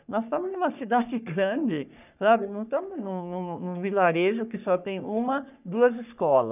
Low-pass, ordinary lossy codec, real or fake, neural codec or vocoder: 3.6 kHz; none; fake; codec, 44.1 kHz, 3.4 kbps, Pupu-Codec